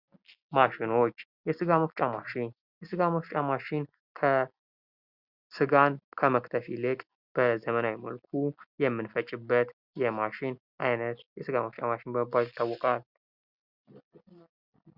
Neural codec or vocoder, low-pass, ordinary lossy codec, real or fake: none; 5.4 kHz; Opus, 64 kbps; real